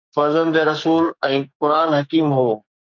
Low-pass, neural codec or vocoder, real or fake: 7.2 kHz; codec, 44.1 kHz, 2.6 kbps, SNAC; fake